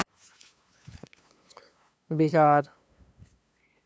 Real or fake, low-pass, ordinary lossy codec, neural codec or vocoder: fake; none; none; codec, 16 kHz, 2 kbps, FunCodec, trained on LibriTTS, 25 frames a second